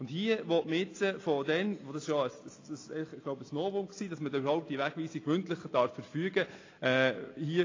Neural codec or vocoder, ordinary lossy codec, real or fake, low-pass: none; AAC, 32 kbps; real; 7.2 kHz